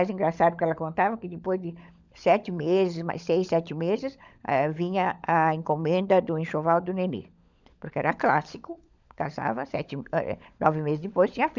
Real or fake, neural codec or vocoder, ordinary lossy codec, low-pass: fake; codec, 16 kHz, 16 kbps, FunCodec, trained on LibriTTS, 50 frames a second; none; 7.2 kHz